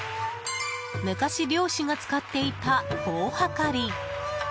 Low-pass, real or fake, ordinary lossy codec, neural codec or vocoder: none; real; none; none